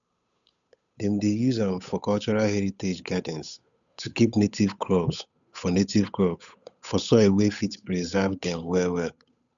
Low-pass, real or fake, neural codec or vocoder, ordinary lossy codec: 7.2 kHz; fake; codec, 16 kHz, 8 kbps, FunCodec, trained on Chinese and English, 25 frames a second; none